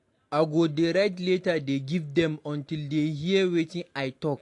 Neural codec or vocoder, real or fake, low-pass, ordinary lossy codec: none; real; 10.8 kHz; AAC, 48 kbps